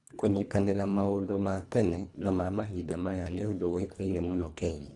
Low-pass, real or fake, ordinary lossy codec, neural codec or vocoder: 10.8 kHz; fake; none; codec, 24 kHz, 1.5 kbps, HILCodec